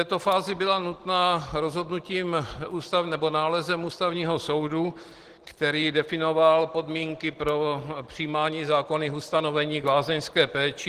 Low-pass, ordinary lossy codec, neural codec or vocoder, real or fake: 14.4 kHz; Opus, 16 kbps; none; real